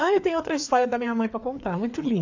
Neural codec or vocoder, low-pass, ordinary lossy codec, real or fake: codec, 16 kHz, 4 kbps, FreqCodec, larger model; 7.2 kHz; AAC, 48 kbps; fake